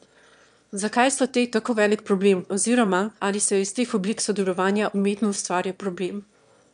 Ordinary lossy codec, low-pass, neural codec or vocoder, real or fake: none; 9.9 kHz; autoencoder, 22.05 kHz, a latent of 192 numbers a frame, VITS, trained on one speaker; fake